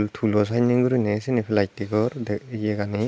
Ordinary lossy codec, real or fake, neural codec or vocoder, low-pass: none; real; none; none